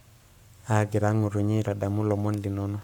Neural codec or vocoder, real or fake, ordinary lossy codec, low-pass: codec, 44.1 kHz, 7.8 kbps, Pupu-Codec; fake; none; 19.8 kHz